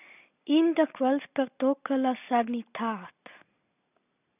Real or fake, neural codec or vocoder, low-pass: real; none; 3.6 kHz